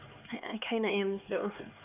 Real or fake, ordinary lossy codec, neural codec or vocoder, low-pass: fake; none; codec, 16 kHz, 4 kbps, X-Codec, HuBERT features, trained on LibriSpeech; 3.6 kHz